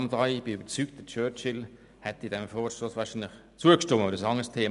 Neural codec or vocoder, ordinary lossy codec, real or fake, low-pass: none; none; real; 10.8 kHz